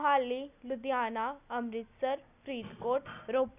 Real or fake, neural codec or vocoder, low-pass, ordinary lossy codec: real; none; 3.6 kHz; none